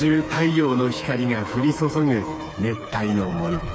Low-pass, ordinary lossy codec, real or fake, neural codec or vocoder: none; none; fake; codec, 16 kHz, 4 kbps, FreqCodec, smaller model